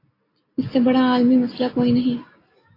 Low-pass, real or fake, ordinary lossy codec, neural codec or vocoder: 5.4 kHz; real; AAC, 24 kbps; none